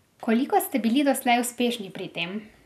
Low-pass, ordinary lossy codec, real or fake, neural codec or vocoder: 14.4 kHz; none; real; none